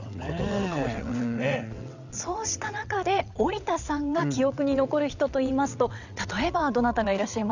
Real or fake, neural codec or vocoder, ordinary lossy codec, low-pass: fake; vocoder, 22.05 kHz, 80 mel bands, WaveNeXt; none; 7.2 kHz